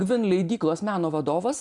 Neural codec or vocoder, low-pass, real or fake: none; 10.8 kHz; real